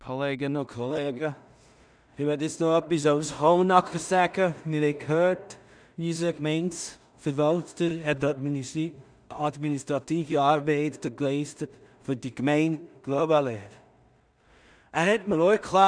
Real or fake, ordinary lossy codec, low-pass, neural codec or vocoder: fake; none; 9.9 kHz; codec, 16 kHz in and 24 kHz out, 0.4 kbps, LongCat-Audio-Codec, two codebook decoder